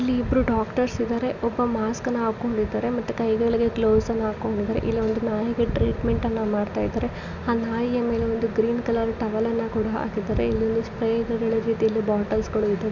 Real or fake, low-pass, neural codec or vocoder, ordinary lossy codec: real; 7.2 kHz; none; none